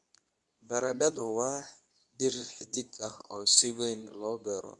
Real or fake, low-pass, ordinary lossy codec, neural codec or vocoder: fake; 10.8 kHz; none; codec, 24 kHz, 0.9 kbps, WavTokenizer, medium speech release version 2